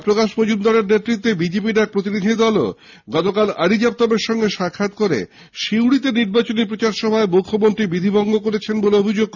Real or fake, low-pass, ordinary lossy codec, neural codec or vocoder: real; 7.2 kHz; none; none